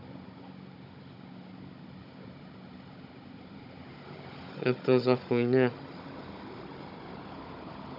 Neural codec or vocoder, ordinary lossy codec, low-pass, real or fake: codec, 16 kHz, 16 kbps, FunCodec, trained on Chinese and English, 50 frames a second; none; 5.4 kHz; fake